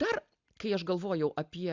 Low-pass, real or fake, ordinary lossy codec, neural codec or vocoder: 7.2 kHz; real; Opus, 64 kbps; none